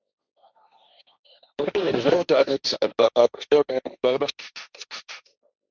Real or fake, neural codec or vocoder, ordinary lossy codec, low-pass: fake; codec, 16 kHz, 1.1 kbps, Voila-Tokenizer; Opus, 64 kbps; 7.2 kHz